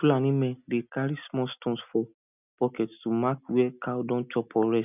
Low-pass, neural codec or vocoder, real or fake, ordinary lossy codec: 3.6 kHz; none; real; none